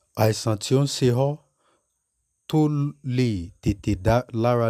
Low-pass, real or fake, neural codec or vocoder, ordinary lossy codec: 14.4 kHz; real; none; none